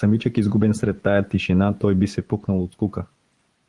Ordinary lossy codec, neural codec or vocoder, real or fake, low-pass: Opus, 24 kbps; vocoder, 24 kHz, 100 mel bands, Vocos; fake; 10.8 kHz